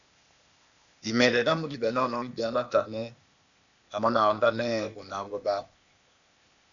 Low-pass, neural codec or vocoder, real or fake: 7.2 kHz; codec, 16 kHz, 0.8 kbps, ZipCodec; fake